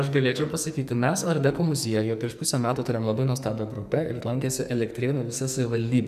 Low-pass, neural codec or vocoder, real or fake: 14.4 kHz; codec, 44.1 kHz, 2.6 kbps, SNAC; fake